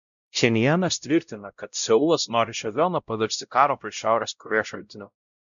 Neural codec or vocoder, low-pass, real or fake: codec, 16 kHz, 0.5 kbps, X-Codec, WavLM features, trained on Multilingual LibriSpeech; 7.2 kHz; fake